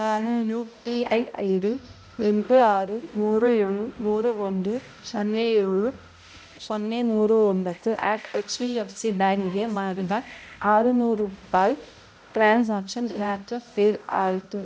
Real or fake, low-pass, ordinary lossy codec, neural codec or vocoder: fake; none; none; codec, 16 kHz, 0.5 kbps, X-Codec, HuBERT features, trained on balanced general audio